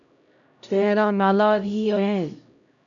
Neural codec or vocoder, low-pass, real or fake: codec, 16 kHz, 0.5 kbps, X-Codec, HuBERT features, trained on LibriSpeech; 7.2 kHz; fake